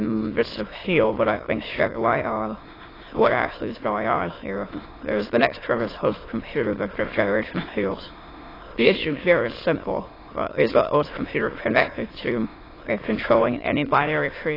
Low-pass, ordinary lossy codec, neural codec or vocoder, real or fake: 5.4 kHz; AAC, 24 kbps; autoencoder, 22.05 kHz, a latent of 192 numbers a frame, VITS, trained on many speakers; fake